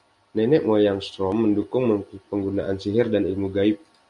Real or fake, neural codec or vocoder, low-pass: real; none; 10.8 kHz